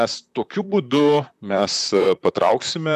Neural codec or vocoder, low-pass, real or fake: vocoder, 44.1 kHz, 128 mel bands, Pupu-Vocoder; 14.4 kHz; fake